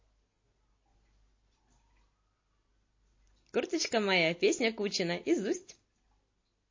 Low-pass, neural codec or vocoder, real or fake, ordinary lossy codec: 7.2 kHz; none; real; MP3, 32 kbps